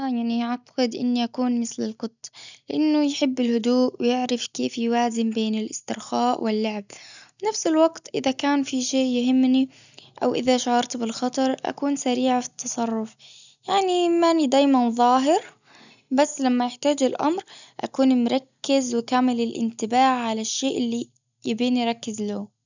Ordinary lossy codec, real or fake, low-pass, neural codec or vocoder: none; real; 7.2 kHz; none